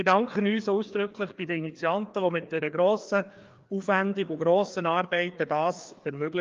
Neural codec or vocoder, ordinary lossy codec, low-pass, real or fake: codec, 16 kHz, 2 kbps, FreqCodec, larger model; Opus, 32 kbps; 7.2 kHz; fake